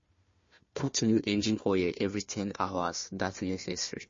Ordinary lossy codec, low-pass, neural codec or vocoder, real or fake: MP3, 32 kbps; 7.2 kHz; codec, 16 kHz, 1 kbps, FunCodec, trained on Chinese and English, 50 frames a second; fake